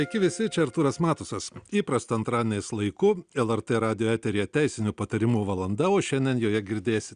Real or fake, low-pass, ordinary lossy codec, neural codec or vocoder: real; 9.9 kHz; Opus, 64 kbps; none